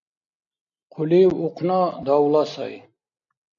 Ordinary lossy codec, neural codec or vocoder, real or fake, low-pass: AAC, 48 kbps; none; real; 7.2 kHz